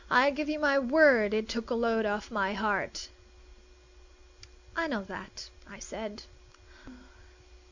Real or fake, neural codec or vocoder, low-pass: real; none; 7.2 kHz